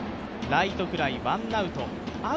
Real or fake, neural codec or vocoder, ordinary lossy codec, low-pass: real; none; none; none